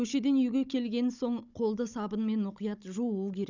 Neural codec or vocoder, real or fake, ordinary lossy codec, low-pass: codec, 16 kHz, 16 kbps, FunCodec, trained on Chinese and English, 50 frames a second; fake; none; 7.2 kHz